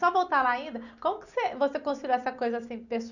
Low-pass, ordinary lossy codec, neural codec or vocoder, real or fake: 7.2 kHz; none; none; real